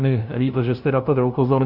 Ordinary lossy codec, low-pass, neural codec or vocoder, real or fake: Opus, 64 kbps; 5.4 kHz; codec, 16 kHz, 0.5 kbps, FunCodec, trained on LibriTTS, 25 frames a second; fake